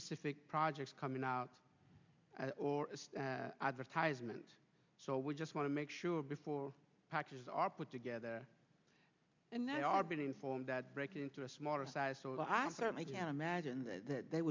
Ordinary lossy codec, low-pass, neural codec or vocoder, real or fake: MP3, 64 kbps; 7.2 kHz; none; real